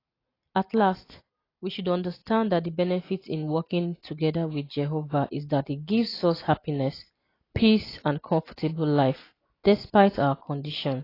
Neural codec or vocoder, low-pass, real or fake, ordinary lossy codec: none; 5.4 kHz; real; AAC, 24 kbps